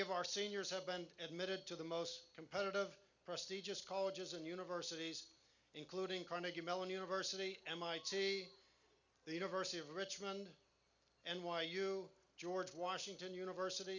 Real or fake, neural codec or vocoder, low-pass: real; none; 7.2 kHz